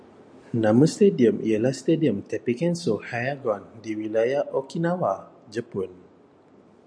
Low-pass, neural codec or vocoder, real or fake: 9.9 kHz; none; real